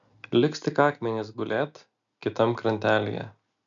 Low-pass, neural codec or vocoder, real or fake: 7.2 kHz; none; real